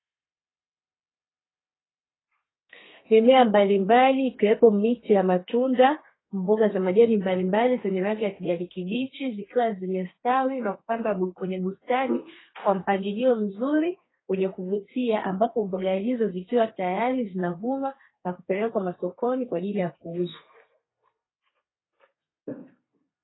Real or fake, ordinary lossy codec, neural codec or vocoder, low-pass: fake; AAC, 16 kbps; codec, 32 kHz, 1.9 kbps, SNAC; 7.2 kHz